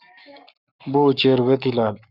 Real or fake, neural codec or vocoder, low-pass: fake; codec, 44.1 kHz, 7.8 kbps, Pupu-Codec; 5.4 kHz